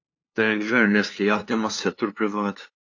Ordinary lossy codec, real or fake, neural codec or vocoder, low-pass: AAC, 48 kbps; fake; codec, 16 kHz, 2 kbps, FunCodec, trained on LibriTTS, 25 frames a second; 7.2 kHz